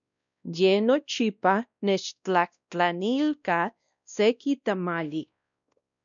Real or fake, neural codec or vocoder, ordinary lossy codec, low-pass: fake; codec, 16 kHz, 1 kbps, X-Codec, WavLM features, trained on Multilingual LibriSpeech; MP3, 96 kbps; 7.2 kHz